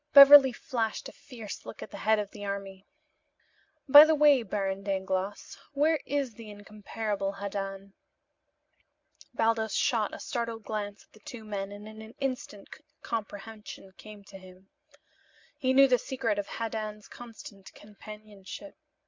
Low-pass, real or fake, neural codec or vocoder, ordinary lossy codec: 7.2 kHz; real; none; MP3, 64 kbps